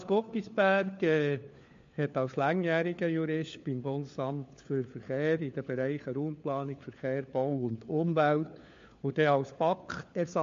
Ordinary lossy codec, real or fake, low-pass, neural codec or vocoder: MP3, 48 kbps; fake; 7.2 kHz; codec, 16 kHz, 4 kbps, FunCodec, trained on LibriTTS, 50 frames a second